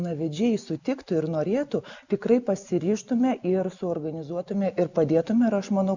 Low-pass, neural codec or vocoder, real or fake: 7.2 kHz; none; real